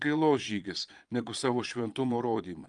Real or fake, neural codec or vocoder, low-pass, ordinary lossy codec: fake; vocoder, 22.05 kHz, 80 mel bands, Vocos; 9.9 kHz; Opus, 32 kbps